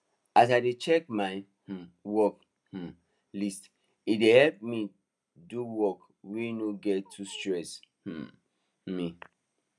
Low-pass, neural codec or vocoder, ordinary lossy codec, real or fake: none; none; none; real